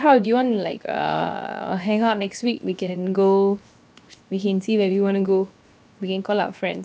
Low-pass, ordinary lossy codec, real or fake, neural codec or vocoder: none; none; fake; codec, 16 kHz, 0.7 kbps, FocalCodec